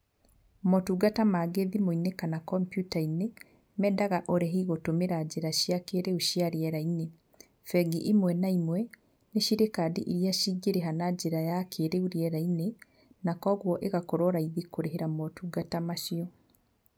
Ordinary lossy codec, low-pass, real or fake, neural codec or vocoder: none; none; real; none